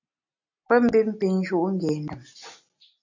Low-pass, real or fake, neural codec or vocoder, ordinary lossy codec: 7.2 kHz; real; none; AAC, 48 kbps